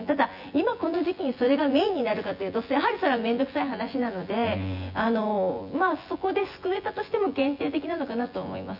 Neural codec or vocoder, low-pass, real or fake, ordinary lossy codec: vocoder, 24 kHz, 100 mel bands, Vocos; 5.4 kHz; fake; none